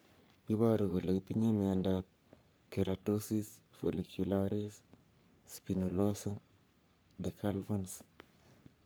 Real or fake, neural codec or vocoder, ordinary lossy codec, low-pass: fake; codec, 44.1 kHz, 3.4 kbps, Pupu-Codec; none; none